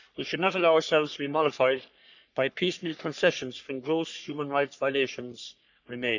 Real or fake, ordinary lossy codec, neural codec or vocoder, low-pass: fake; none; codec, 44.1 kHz, 3.4 kbps, Pupu-Codec; 7.2 kHz